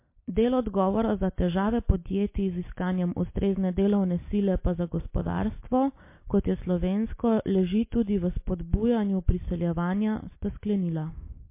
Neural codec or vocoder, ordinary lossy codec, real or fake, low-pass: none; MP3, 24 kbps; real; 3.6 kHz